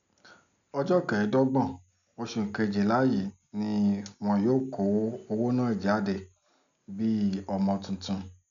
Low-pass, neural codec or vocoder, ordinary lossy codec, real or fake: 7.2 kHz; none; none; real